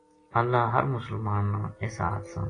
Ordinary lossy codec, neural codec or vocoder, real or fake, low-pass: AAC, 32 kbps; none; real; 9.9 kHz